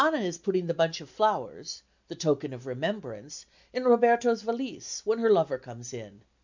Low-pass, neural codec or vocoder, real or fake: 7.2 kHz; none; real